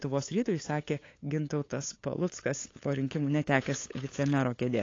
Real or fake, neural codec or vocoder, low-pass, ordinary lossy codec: fake; codec, 16 kHz, 8 kbps, FunCodec, trained on LibriTTS, 25 frames a second; 7.2 kHz; AAC, 32 kbps